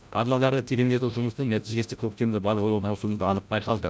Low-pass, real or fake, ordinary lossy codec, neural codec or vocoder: none; fake; none; codec, 16 kHz, 0.5 kbps, FreqCodec, larger model